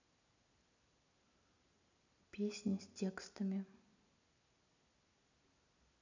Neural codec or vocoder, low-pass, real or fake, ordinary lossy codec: none; 7.2 kHz; real; none